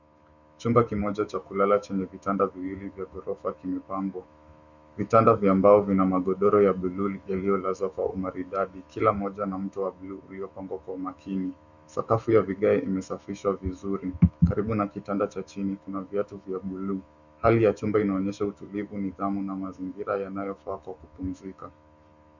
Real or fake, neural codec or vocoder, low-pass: fake; autoencoder, 48 kHz, 128 numbers a frame, DAC-VAE, trained on Japanese speech; 7.2 kHz